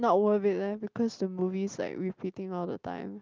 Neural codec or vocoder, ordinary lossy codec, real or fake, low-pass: none; Opus, 32 kbps; real; 7.2 kHz